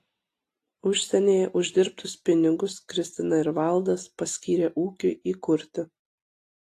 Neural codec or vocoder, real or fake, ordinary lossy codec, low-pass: none; real; AAC, 48 kbps; 14.4 kHz